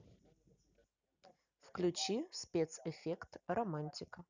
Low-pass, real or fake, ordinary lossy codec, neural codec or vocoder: 7.2 kHz; real; none; none